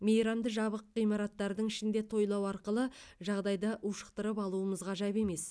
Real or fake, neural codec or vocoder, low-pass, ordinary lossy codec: real; none; none; none